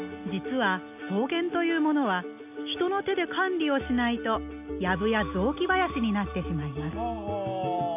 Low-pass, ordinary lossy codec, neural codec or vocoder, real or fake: 3.6 kHz; none; none; real